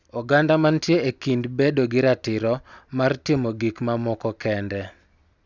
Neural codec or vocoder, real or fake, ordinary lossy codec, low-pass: none; real; Opus, 64 kbps; 7.2 kHz